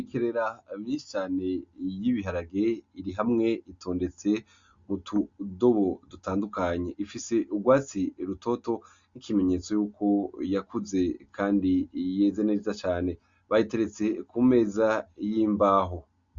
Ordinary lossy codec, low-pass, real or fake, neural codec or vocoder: Opus, 64 kbps; 7.2 kHz; real; none